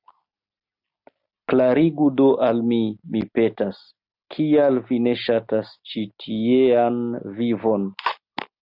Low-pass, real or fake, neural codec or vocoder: 5.4 kHz; real; none